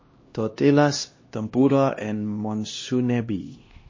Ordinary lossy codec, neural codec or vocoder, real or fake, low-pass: MP3, 32 kbps; codec, 16 kHz, 1 kbps, X-Codec, HuBERT features, trained on LibriSpeech; fake; 7.2 kHz